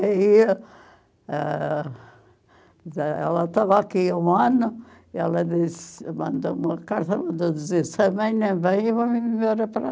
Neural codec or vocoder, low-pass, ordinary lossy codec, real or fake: none; none; none; real